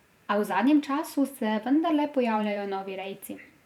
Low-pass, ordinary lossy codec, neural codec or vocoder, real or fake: 19.8 kHz; none; vocoder, 44.1 kHz, 128 mel bands every 512 samples, BigVGAN v2; fake